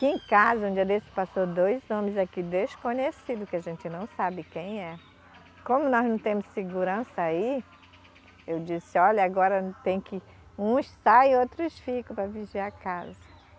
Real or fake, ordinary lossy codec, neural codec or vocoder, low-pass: real; none; none; none